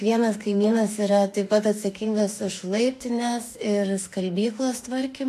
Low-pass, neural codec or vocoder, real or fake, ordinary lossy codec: 14.4 kHz; autoencoder, 48 kHz, 32 numbers a frame, DAC-VAE, trained on Japanese speech; fake; AAC, 64 kbps